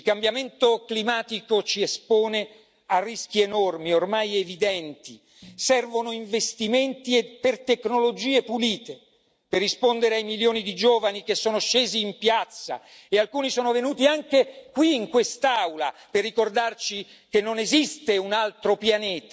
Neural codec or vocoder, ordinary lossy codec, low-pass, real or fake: none; none; none; real